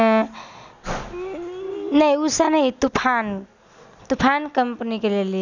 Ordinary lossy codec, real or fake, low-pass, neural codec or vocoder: none; real; 7.2 kHz; none